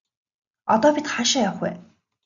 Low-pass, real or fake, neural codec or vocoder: 7.2 kHz; real; none